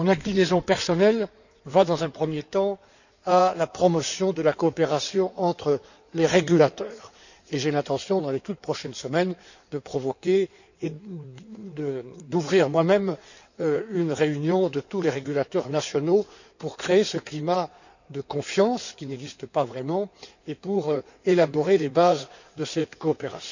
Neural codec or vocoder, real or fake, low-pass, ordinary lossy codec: codec, 16 kHz in and 24 kHz out, 2.2 kbps, FireRedTTS-2 codec; fake; 7.2 kHz; none